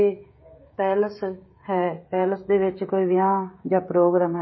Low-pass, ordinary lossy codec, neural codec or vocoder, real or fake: 7.2 kHz; MP3, 24 kbps; codec, 16 kHz, 16 kbps, FreqCodec, smaller model; fake